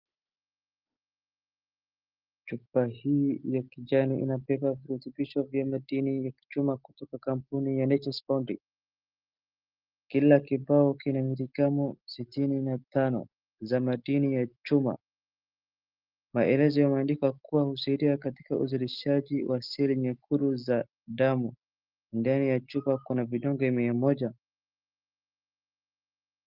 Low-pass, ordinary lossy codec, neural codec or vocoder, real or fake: 5.4 kHz; Opus, 32 kbps; none; real